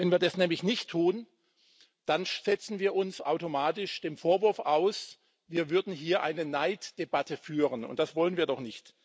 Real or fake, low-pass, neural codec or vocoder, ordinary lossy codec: real; none; none; none